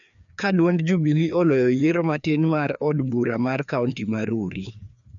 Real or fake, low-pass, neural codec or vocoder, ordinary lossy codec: fake; 7.2 kHz; codec, 16 kHz, 2 kbps, FreqCodec, larger model; none